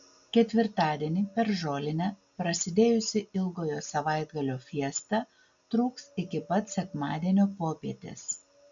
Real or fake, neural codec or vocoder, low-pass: real; none; 7.2 kHz